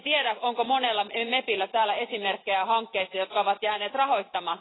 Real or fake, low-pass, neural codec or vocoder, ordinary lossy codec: real; 7.2 kHz; none; AAC, 16 kbps